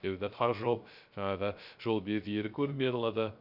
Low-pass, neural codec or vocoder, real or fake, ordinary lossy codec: 5.4 kHz; codec, 16 kHz, 0.3 kbps, FocalCodec; fake; none